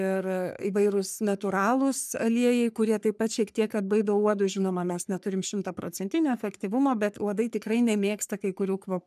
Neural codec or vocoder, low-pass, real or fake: codec, 44.1 kHz, 3.4 kbps, Pupu-Codec; 14.4 kHz; fake